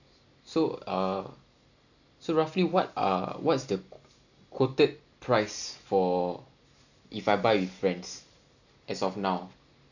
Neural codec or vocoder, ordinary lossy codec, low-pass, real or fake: none; none; 7.2 kHz; real